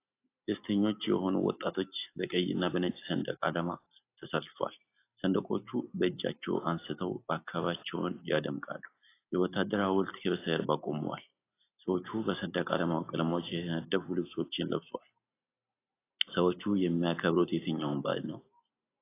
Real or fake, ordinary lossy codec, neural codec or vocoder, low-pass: real; AAC, 24 kbps; none; 3.6 kHz